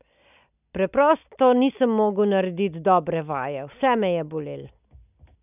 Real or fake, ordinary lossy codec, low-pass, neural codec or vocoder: real; none; 3.6 kHz; none